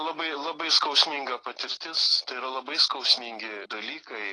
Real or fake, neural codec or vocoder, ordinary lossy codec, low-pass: real; none; AAC, 32 kbps; 10.8 kHz